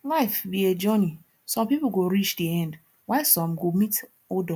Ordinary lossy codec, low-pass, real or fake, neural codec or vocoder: none; none; real; none